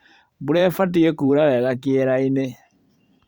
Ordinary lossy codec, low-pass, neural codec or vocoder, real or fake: Opus, 64 kbps; 19.8 kHz; vocoder, 44.1 kHz, 128 mel bands every 512 samples, BigVGAN v2; fake